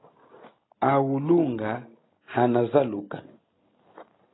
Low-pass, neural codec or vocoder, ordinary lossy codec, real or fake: 7.2 kHz; none; AAC, 16 kbps; real